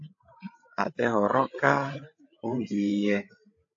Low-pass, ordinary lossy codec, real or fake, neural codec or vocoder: 7.2 kHz; AAC, 48 kbps; fake; codec, 16 kHz, 8 kbps, FreqCodec, larger model